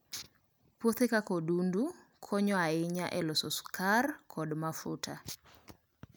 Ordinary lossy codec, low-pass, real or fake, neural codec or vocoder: none; none; real; none